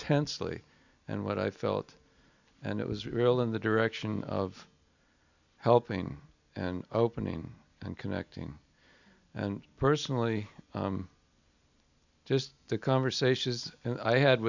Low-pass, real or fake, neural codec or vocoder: 7.2 kHz; real; none